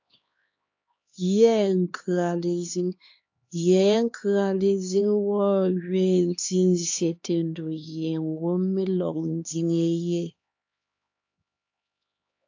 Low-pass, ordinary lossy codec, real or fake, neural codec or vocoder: 7.2 kHz; AAC, 48 kbps; fake; codec, 16 kHz, 2 kbps, X-Codec, HuBERT features, trained on LibriSpeech